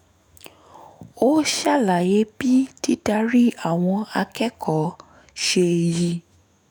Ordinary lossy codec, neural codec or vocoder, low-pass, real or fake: none; autoencoder, 48 kHz, 128 numbers a frame, DAC-VAE, trained on Japanese speech; none; fake